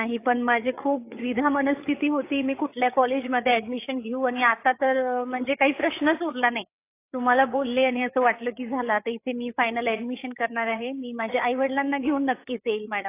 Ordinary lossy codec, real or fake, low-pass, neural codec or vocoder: AAC, 24 kbps; fake; 3.6 kHz; codec, 16 kHz, 8 kbps, FreqCodec, larger model